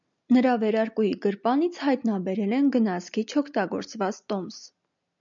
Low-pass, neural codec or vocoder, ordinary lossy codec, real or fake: 7.2 kHz; none; MP3, 96 kbps; real